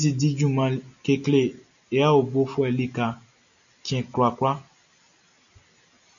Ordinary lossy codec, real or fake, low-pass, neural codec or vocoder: MP3, 96 kbps; real; 7.2 kHz; none